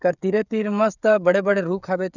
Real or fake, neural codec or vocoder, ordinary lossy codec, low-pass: fake; codec, 16 kHz, 16 kbps, FreqCodec, smaller model; none; 7.2 kHz